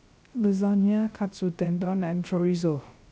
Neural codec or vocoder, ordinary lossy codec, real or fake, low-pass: codec, 16 kHz, 0.3 kbps, FocalCodec; none; fake; none